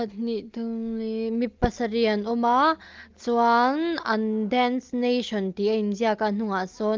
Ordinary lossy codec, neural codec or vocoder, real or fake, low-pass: Opus, 24 kbps; none; real; 7.2 kHz